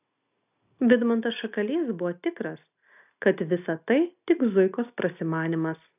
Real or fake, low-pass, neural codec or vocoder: real; 3.6 kHz; none